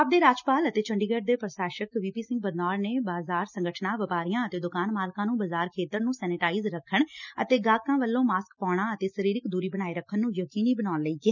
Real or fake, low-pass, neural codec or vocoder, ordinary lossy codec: real; 7.2 kHz; none; none